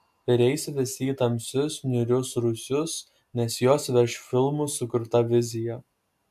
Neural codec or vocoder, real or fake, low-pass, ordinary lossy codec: none; real; 14.4 kHz; AAC, 96 kbps